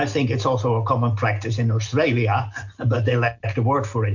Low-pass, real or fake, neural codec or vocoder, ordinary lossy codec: 7.2 kHz; real; none; MP3, 48 kbps